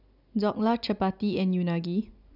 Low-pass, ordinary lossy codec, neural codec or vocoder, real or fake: 5.4 kHz; none; none; real